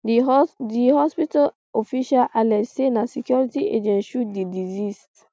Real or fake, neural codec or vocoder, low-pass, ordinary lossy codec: real; none; none; none